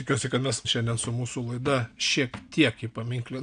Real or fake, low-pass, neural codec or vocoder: real; 9.9 kHz; none